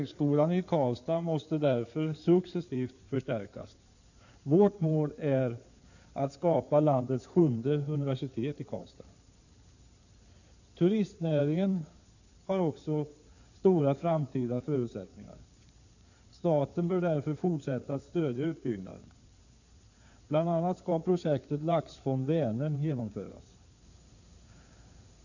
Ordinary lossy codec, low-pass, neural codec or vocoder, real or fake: none; 7.2 kHz; codec, 16 kHz in and 24 kHz out, 2.2 kbps, FireRedTTS-2 codec; fake